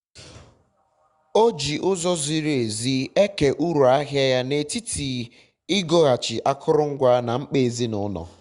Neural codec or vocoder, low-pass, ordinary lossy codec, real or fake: none; 10.8 kHz; Opus, 64 kbps; real